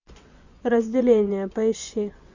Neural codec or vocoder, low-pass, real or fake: vocoder, 24 kHz, 100 mel bands, Vocos; 7.2 kHz; fake